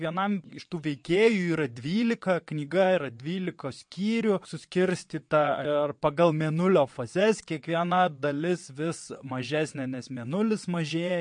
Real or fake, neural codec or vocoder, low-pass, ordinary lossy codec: fake; vocoder, 22.05 kHz, 80 mel bands, WaveNeXt; 9.9 kHz; MP3, 48 kbps